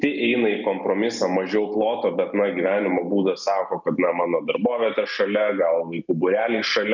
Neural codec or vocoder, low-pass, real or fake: none; 7.2 kHz; real